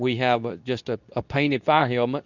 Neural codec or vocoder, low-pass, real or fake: codec, 24 kHz, 0.9 kbps, WavTokenizer, medium speech release version 2; 7.2 kHz; fake